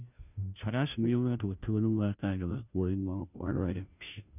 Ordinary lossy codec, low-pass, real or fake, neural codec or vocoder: none; 3.6 kHz; fake; codec, 16 kHz, 0.5 kbps, FunCodec, trained on Chinese and English, 25 frames a second